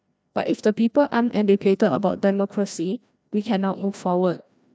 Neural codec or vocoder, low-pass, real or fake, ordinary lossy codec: codec, 16 kHz, 1 kbps, FreqCodec, larger model; none; fake; none